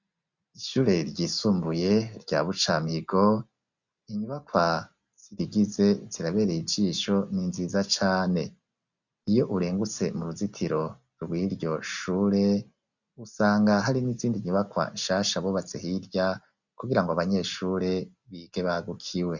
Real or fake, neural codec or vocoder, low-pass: real; none; 7.2 kHz